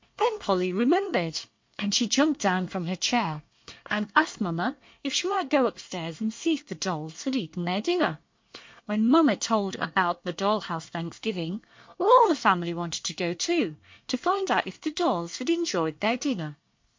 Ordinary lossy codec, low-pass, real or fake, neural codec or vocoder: MP3, 48 kbps; 7.2 kHz; fake; codec, 24 kHz, 1 kbps, SNAC